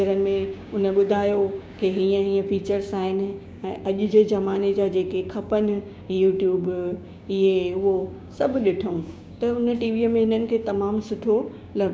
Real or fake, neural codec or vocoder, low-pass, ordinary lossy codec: fake; codec, 16 kHz, 6 kbps, DAC; none; none